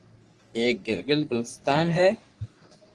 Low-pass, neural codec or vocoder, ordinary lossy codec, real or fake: 10.8 kHz; codec, 44.1 kHz, 3.4 kbps, Pupu-Codec; Opus, 24 kbps; fake